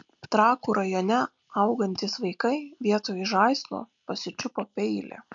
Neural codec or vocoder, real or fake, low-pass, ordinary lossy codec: none; real; 7.2 kHz; MP3, 64 kbps